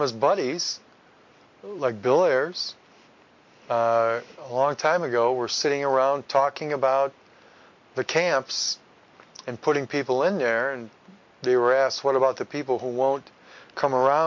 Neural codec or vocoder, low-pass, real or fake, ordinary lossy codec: none; 7.2 kHz; real; MP3, 48 kbps